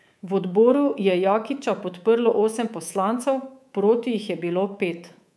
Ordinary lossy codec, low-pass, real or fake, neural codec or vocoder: none; none; fake; codec, 24 kHz, 3.1 kbps, DualCodec